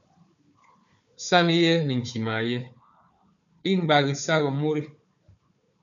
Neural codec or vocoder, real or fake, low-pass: codec, 16 kHz, 4 kbps, FunCodec, trained on Chinese and English, 50 frames a second; fake; 7.2 kHz